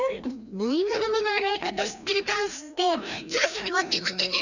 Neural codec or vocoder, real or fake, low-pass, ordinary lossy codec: codec, 16 kHz, 1 kbps, FreqCodec, larger model; fake; 7.2 kHz; none